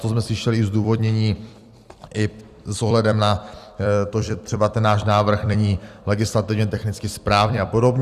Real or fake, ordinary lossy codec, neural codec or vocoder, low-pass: fake; Opus, 64 kbps; vocoder, 44.1 kHz, 128 mel bands every 256 samples, BigVGAN v2; 14.4 kHz